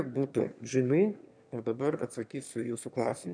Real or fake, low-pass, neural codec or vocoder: fake; 9.9 kHz; autoencoder, 22.05 kHz, a latent of 192 numbers a frame, VITS, trained on one speaker